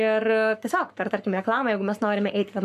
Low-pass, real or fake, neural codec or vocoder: 14.4 kHz; fake; codec, 44.1 kHz, 7.8 kbps, Pupu-Codec